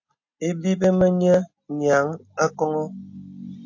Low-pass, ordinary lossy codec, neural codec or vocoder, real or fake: 7.2 kHz; AAC, 48 kbps; none; real